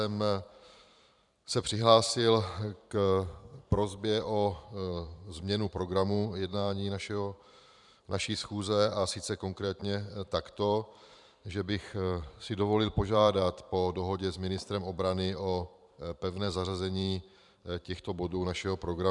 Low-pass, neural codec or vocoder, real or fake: 10.8 kHz; vocoder, 44.1 kHz, 128 mel bands every 512 samples, BigVGAN v2; fake